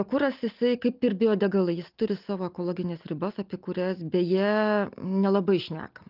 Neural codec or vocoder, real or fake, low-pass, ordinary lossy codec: none; real; 5.4 kHz; Opus, 32 kbps